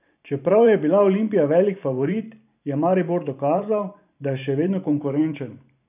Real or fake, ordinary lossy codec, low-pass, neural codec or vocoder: fake; none; 3.6 kHz; vocoder, 44.1 kHz, 128 mel bands every 512 samples, BigVGAN v2